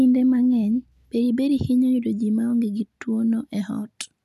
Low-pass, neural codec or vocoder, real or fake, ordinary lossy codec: 14.4 kHz; none; real; none